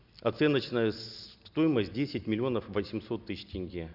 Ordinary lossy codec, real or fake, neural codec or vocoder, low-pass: none; real; none; 5.4 kHz